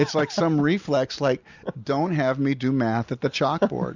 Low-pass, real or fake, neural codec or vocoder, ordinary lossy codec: 7.2 kHz; real; none; Opus, 64 kbps